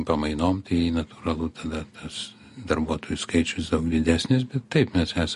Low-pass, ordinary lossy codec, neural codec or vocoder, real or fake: 14.4 kHz; MP3, 48 kbps; none; real